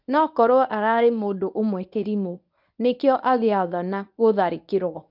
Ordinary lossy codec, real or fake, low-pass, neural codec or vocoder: none; fake; 5.4 kHz; codec, 24 kHz, 0.9 kbps, WavTokenizer, medium speech release version 1